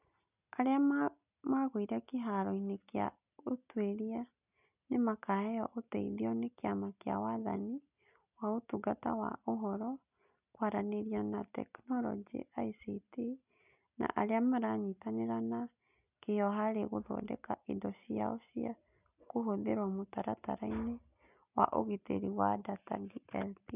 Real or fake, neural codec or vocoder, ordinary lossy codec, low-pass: real; none; none; 3.6 kHz